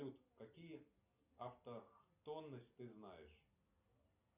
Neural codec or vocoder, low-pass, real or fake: none; 3.6 kHz; real